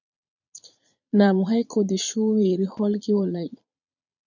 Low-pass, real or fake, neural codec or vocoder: 7.2 kHz; fake; vocoder, 22.05 kHz, 80 mel bands, Vocos